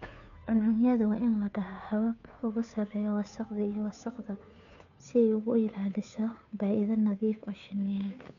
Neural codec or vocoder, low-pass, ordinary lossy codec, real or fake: codec, 16 kHz, 2 kbps, FunCodec, trained on Chinese and English, 25 frames a second; 7.2 kHz; none; fake